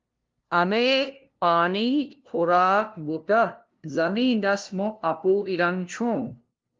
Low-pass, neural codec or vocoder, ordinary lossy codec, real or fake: 7.2 kHz; codec, 16 kHz, 0.5 kbps, FunCodec, trained on LibriTTS, 25 frames a second; Opus, 32 kbps; fake